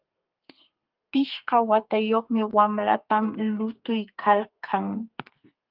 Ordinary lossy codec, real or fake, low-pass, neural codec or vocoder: Opus, 32 kbps; fake; 5.4 kHz; codec, 32 kHz, 1.9 kbps, SNAC